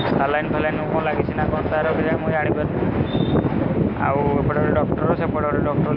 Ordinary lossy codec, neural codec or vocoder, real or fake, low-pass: none; none; real; 5.4 kHz